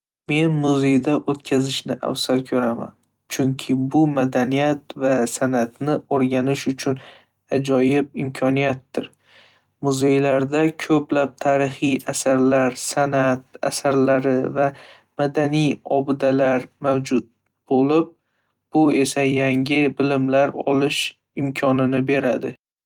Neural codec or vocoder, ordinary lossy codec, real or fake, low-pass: vocoder, 44.1 kHz, 128 mel bands every 256 samples, BigVGAN v2; Opus, 32 kbps; fake; 19.8 kHz